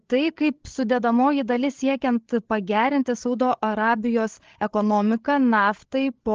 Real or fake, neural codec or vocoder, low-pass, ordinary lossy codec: fake; codec, 16 kHz, 8 kbps, FreqCodec, larger model; 7.2 kHz; Opus, 16 kbps